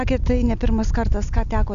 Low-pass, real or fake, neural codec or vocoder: 7.2 kHz; real; none